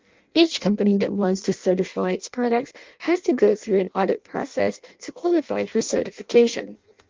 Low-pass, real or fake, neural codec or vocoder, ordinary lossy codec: 7.2 kHz; fake; codec, 16 kHz in and 24 kHz out, 0.6 kbps, FireRedTTS-2 codec; Opus, 32 kbps